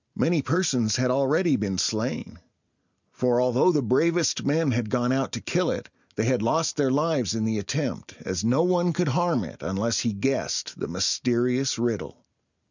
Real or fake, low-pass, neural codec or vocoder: real; 7.2 kHz; none